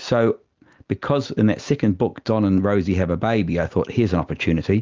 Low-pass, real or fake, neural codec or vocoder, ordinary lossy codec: 7.2 kHz; real; none; Opus, 24 kbps